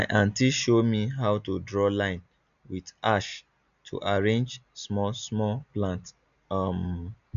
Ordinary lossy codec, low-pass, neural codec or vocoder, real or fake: none; 7.2 kHz; none; real